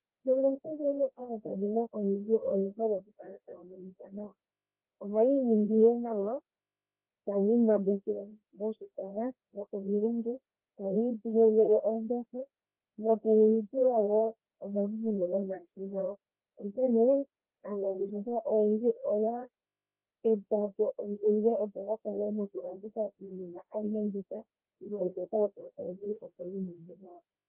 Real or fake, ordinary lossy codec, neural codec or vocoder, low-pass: fake; Opus, 32 kbps; codec, 16 kHz, 1 kbps, FreqCodec, larger model; 3.6 kHz